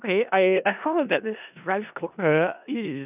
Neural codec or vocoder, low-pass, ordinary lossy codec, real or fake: codec, 16 kHz in and 24 kHz out, 0.4 kbps, LongCat-Audio-Codec, four codebook decoder; 3.6 kHz; none; fake